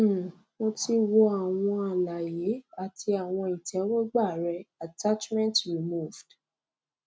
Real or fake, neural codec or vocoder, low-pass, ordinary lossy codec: real; none; none; none